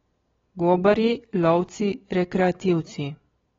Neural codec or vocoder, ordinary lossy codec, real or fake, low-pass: none; AAC, 24 kbps; real; 7.2 kHz